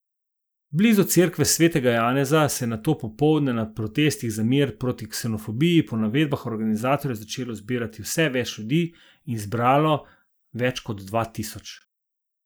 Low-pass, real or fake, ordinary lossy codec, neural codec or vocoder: none; real; none; none